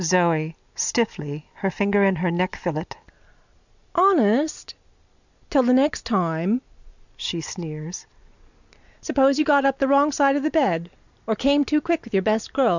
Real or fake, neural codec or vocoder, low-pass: real; none; 7.2 kHz